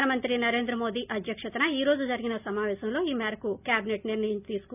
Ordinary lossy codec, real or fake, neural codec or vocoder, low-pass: none; real; none; 3.6 kHz